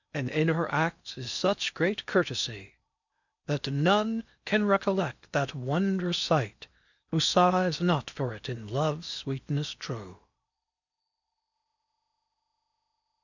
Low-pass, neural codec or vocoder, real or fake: 7.2 kHz; codec, 16 kHz in and 24 kHz out, 0.8 kbps, FocalCodec, streaming, 65536 codes; fake